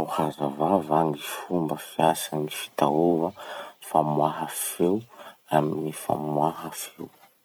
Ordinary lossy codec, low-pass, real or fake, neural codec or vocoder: none; none; fake; vocoder, 44.1 kHz, 128 mel bands every 256 samples, BigVGAN v2